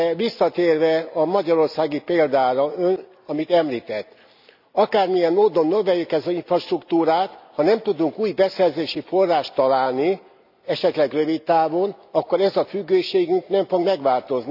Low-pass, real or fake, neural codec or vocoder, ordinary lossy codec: 5.4 kHz; real; none; none